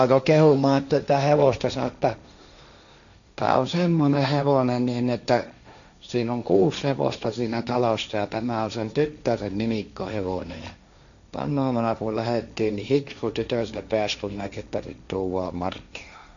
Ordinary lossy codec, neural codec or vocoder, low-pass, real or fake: none; codec, 16 kHz, 1.1 kbps, Voila-Tokenizer; 7.2 kHz; fake